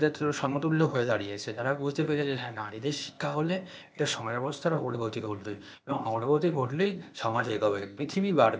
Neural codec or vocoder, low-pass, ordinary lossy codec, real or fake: codec, 16 kHz, 0.8 kbps, ZipCodec; none; none; fake